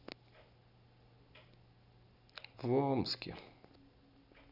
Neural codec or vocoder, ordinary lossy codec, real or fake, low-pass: none; none; real; 5.4 kHz